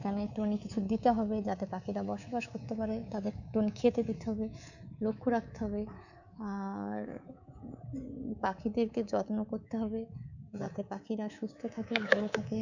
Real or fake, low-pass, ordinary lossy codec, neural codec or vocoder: fake; 7.2 kHz; none; codec, 24 kHz, 3.1 kbps, DualCodec